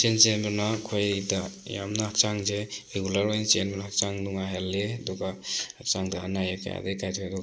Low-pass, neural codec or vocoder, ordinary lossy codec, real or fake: none; none; none; real